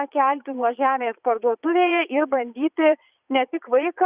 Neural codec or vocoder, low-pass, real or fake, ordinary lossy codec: vocoder, 22.05 kHz, 80 mel bands, Vocos; 3.6 kHz; fake; Opus, 64 kbps